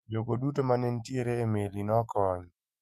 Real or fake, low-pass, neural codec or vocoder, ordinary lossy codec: fake; 14.4 kHz; autoencoder, 48 kHz, 128 numbers a frame, DAC-VAE, trained on Japanese speech; none